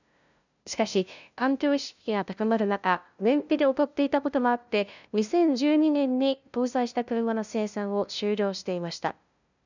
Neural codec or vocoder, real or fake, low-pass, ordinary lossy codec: codec, 16 kHz, 0.5 kbps, FunCodec, trained on LibriTTS, 25 frames a second; fake; 7.2 kHz; none